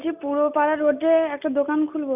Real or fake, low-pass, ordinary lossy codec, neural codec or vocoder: real; 3.6 kHz; none; none